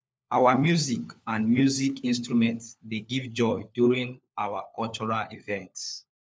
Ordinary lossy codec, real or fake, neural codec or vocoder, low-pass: none; fake; codec, 16 kHz, 4 kbps, FunCodec, trained on LibriTTS, 50 frames a second; none